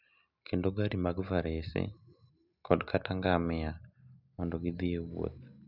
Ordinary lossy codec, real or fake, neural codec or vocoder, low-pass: none; real; none; 5.4 kHz